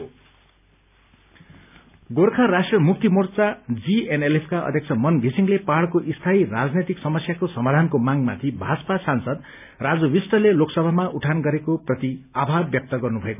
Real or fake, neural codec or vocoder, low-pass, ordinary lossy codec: real; none; 3.6 kHz; none